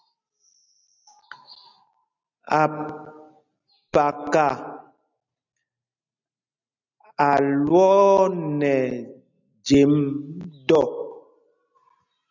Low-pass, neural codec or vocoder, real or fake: 7.2 kHz; none; real